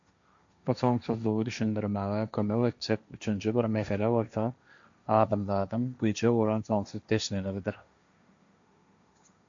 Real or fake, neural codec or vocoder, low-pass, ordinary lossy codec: fake; codec, 16 kHz, 1.1 kbps, Voila-Tokenizer; 7.2 kHz; MP3, 64 kbps